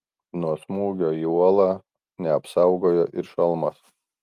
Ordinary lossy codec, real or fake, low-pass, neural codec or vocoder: Opus, 32 kbps; real; 14.4 kHz; none